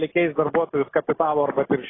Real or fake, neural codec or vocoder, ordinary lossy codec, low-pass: real; none; AAC, 16 kbps; 7.2 kHz